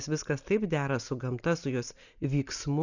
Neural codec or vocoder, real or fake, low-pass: none; real; 7.2 kHz